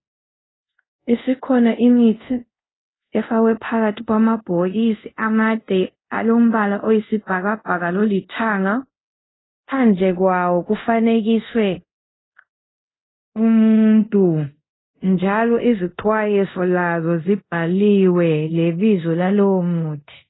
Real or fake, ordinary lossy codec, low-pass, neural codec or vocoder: fake; AAC, 16 kbps; 7.2 kHz; codec, 24 kHz, 0.5 kbps, DualCodec